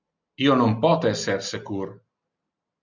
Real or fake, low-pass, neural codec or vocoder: real; 7.2 kHz; none